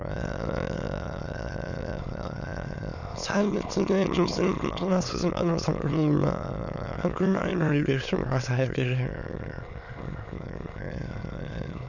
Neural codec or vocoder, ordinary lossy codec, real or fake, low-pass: autoencoder, 22.05 kHz, a latent of 192 numbers a frame, VITS, trained on many speakers; none; fake; 7.2 kHz